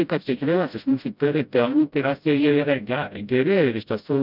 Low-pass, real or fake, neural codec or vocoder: 5.4 kHz; fake; codec, 16 kHz, 0.5 kbps, FreqCodec, smaller model